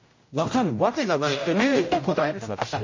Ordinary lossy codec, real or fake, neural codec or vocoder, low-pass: MP3, 32 kbps; fake; codec, 16 kHz, 0.5 kbps, X-Codec, HuBERT features, trained on general audio; 7.2 kHz